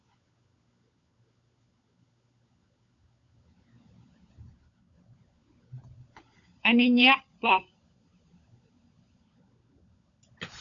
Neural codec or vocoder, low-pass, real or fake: codec, 16 kHz, 16 kbps, FunCodec, trained on LibriTTS, 50 frames a second; 7.2 kHz; fake